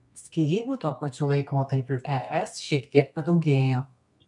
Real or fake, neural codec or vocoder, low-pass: fake; codec, 24 kHz, 0.9 kbps, WavTokenizer, medium music audio release; 10.8 kHz